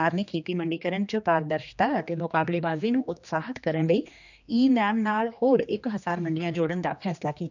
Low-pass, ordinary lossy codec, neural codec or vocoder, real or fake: 7.2 kHz; none; codec, 16 kHz, 2 kbps, X-Codec, HuBERT features, trained on general audio; fake